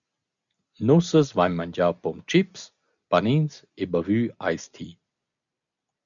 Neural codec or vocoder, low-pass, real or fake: none; 7.2 kHz; real